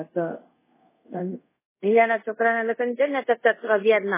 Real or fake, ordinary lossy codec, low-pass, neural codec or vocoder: fake; MP3, 16 kbps; 3.6 kHz; codec, 24 kHz, 0.5 kbps, DualCodec